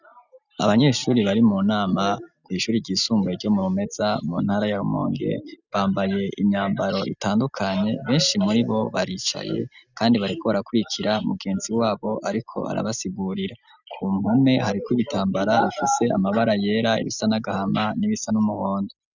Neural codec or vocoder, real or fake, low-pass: none; real; 7.2 kHz